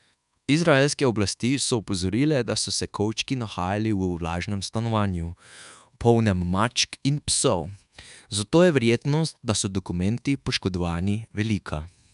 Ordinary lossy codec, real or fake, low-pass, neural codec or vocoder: none; fake; 10.8 kHz; codec, 24 kHz, 1.2 kbps, DualCodec